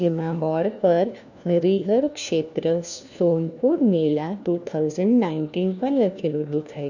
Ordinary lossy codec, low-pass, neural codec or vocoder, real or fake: none; 7.2 kHz; codec, 16 kHz, 1 kbps, FunCodec, trained on LibriTTS, 50 frames a second; fake